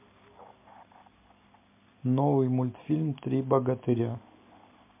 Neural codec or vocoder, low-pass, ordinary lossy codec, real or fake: none; 3.6 kHz; MP3, 24 kbps; real